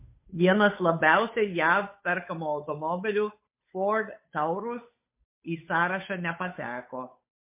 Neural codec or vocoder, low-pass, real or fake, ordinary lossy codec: codec, 16 kHz, 8 kbps, FunCodec, trained on Chinese and English, 25 frames a second; 3.6 kHz; fake; MP3, 24 kbps